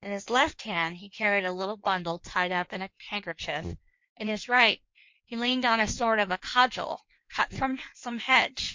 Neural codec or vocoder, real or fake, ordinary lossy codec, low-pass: codec, 16 kHz in and 24 kHz out, 1.1 kbps, FireRedTTS-2 codec; fake; MP3, 48 kbps; 7.2 kHz